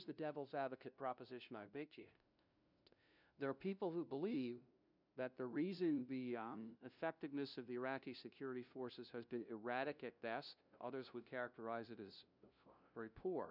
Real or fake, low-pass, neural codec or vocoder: fake; 5.4 kHz; codec, 16 kHz, 0.5 kbps, FunCodec, trained on LibriTTS, 25 frames a second